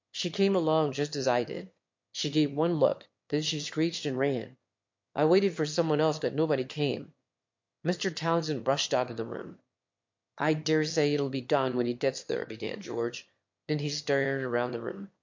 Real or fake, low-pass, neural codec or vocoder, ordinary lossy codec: fake; 7.2 kHz; autoencoder, 22.05 kHz, a latent of 192 numbers a frame, VITS, trained on one speaker; MP3, 48 kbps